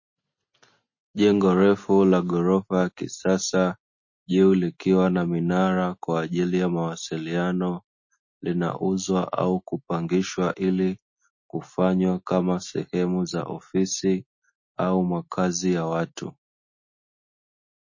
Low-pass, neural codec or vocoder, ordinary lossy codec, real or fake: 7.2 kHz; none; MP3, 32 kbps; real